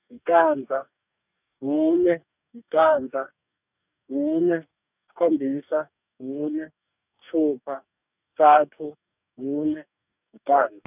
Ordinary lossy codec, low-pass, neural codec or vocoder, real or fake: none; 3.6 kHz; codec, 44.1 kHz, 2.6 kbps, DAC; fake